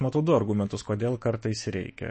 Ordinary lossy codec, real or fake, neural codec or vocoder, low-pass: MP3, 32 kbps; fake; autoencoder, 48 kHz, 128 numbers a frame, DAC-VAE, trained on Japanese speech; 10.8 kHz